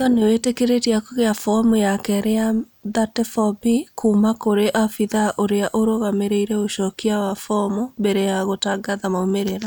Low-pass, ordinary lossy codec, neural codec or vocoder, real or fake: none; none; none; real